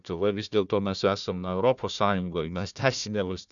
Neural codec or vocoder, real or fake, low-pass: codec, 16 kHz, 1 kbps, FunCodec, trained on Chinese and English, 50 frames a second; fake; 7.2 kHz